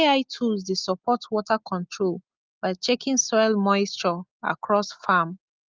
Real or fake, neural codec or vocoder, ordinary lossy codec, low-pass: real; none; Opus, 24 kbps; 7.2 kHz